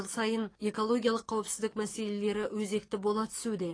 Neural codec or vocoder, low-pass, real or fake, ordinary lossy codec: codec, 44.1 kHz, 7.8 kbps, DAC; 9.9 kHz; fake; AAC, 32 kbps